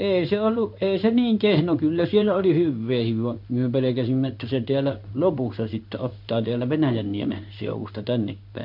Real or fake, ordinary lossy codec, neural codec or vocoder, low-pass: fake; none; codec, 16 kHz in and 24 kHz out, 1 kbps, XY-Tokenizer; 5.4 kHz